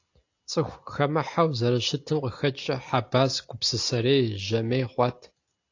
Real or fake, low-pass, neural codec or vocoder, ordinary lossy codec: real; 7.2 kHz; none; AAC, 48 kbps